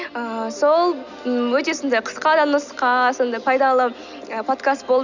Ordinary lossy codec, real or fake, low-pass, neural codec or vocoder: none; real; 7.2 kHz; none